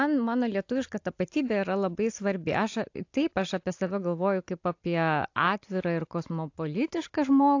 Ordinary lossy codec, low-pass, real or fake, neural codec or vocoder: AAC, 48 kbps; 7.2 kHz; real; none